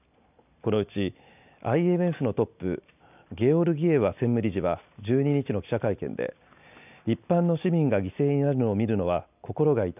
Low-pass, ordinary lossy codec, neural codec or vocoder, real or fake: 3.6 kHz; none; none; real